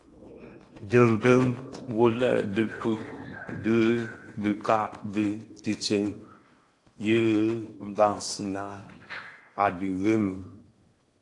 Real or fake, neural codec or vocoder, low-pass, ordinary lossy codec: fake; codec, 16 kHz in and 24 kHz out, 0.8 kbps, FocalCodec, streaming, 65536 codes; 10.8 kHz; AAC, 48 kbps